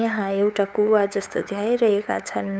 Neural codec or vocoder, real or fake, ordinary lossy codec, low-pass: codec, 16 kHz, 8 kbps, FunCodec, trained on LibriTTS, 25 frames a second; fake; none; none